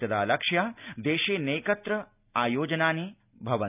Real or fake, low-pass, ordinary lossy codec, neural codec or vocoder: real; 3.6 kHz; none; none